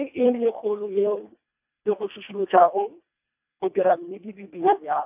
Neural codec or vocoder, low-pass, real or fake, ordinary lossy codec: codec, 24 kHz, 1.5 kbps, HILCodec; 3.6 kHz; fake; AAC, 32 kbps